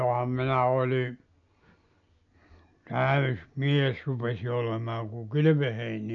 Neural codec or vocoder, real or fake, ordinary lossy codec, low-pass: none; real; none; 7.2 kHz